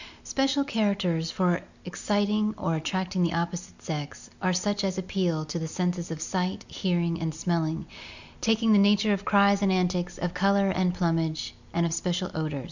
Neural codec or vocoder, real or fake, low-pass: none; real; 7.2 kHz